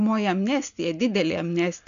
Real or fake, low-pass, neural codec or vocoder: real; 7.2 kHz; none